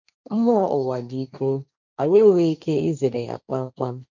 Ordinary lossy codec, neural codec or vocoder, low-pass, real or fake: none; codec, 16 kHz, 1.1 kbps, Voila-Tokenizer; 7.2 kHz; fake